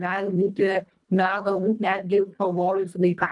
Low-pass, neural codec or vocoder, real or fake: 10.8 kHz; codec, 24 kHz, 1.5 kbps, HILCodec; fake